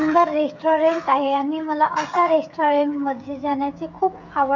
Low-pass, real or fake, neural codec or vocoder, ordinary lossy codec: 7.2 kHz; fake; codec, 16 kHz, 8 kbps, FreqCodec, smaller model; MP3, 64 kbps